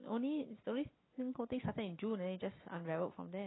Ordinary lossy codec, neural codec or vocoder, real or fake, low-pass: AAC, 16 kbps; none; real; 7.2 kHz